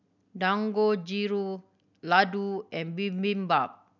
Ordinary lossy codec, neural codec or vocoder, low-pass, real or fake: none; none; 7.2 kHz; real